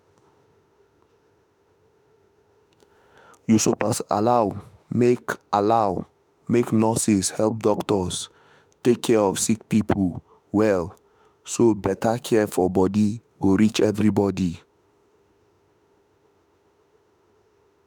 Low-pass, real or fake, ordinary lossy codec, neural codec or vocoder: none; fake; none; autoencoder, 48 kHz, 32 numbers a frame, DAC-VAE, trained on Japanese speech